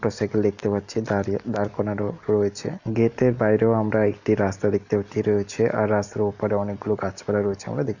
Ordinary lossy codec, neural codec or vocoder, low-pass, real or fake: none; none; 7.2 kHz; real